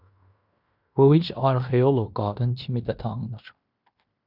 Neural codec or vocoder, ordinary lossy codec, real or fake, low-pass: codec, 16 kHz in and 24 kHz out, 0.9 kbps, LongCat-Audio-Codec, fine tuned four codebook decoder; Opus, 64 kbps; fake; 5.4 kHz